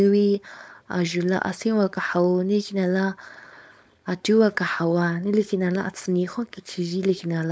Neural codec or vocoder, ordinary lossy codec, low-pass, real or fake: codec, 16 kHz, 4.8 kbps, FACodec; none; none; fake